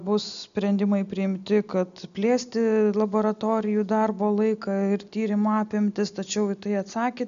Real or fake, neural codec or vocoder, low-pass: real; none; 7.2 kHz